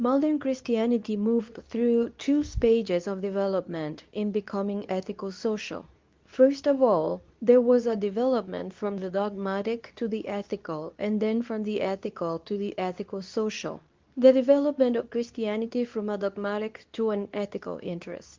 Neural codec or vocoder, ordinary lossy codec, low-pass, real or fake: codec, 24 kHz, 0.9 kbps, WavTokenizer, medium speech release version 2; Opus, 24 kbps; 7.2 kHz; fake